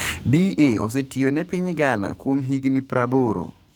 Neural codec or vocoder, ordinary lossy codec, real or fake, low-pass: codec, 44.1 kHz, 2.6 kbps, SNAC; none; fake; none